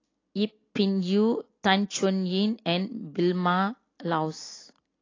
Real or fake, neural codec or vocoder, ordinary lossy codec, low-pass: real; none; AAC, 32 kbps; 7.2 kHz